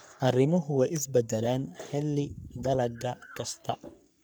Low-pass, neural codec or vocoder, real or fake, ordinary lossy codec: none; codec, 44.1 kHz, 3.4 kbps, Pupu-Codec; fake; none